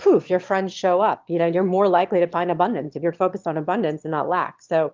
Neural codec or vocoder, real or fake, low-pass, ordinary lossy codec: autoencoder, 22.05 kHz, a latent of 192 numbers a frame, VITS, trained on one speaker; fake; 7.2 kHz; Opus, 32 kbps